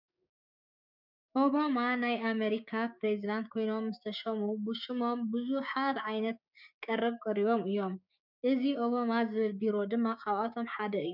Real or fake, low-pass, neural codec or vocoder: fake; 5.4 kHz; codec, 16 kHz, 6 kbps, DAC